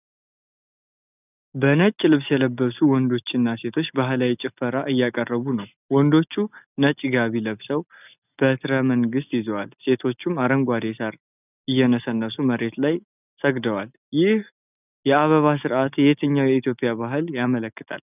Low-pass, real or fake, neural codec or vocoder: 3.6 kHz; real; none